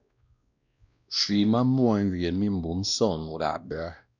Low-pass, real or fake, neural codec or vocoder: 7.2 kHz; fake; codec, 16 kHz, 1 kbps, X-Codec, WavLM features, trained on Multilingual LibriSpeech